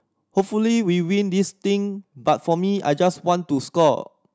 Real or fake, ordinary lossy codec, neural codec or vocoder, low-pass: real; none; none; none